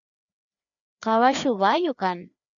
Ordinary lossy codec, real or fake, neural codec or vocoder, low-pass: MP3, 96 kbps; fake; codec, 16 kHz, 2 kbps, FreqCodec, larger model; 7.2 kHz